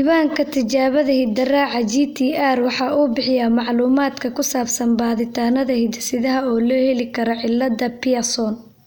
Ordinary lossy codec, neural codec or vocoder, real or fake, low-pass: none; none; real; none